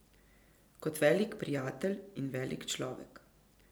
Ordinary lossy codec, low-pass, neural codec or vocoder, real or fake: none; none; none; real